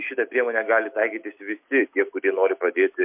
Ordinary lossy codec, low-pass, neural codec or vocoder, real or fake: MP3, 32 kbps; 3.6 kHz; none; real